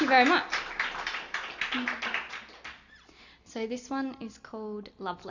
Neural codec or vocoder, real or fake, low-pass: none; real; 7.2 kHz